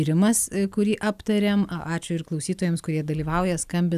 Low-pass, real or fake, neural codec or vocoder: 14.4 kHz; real; none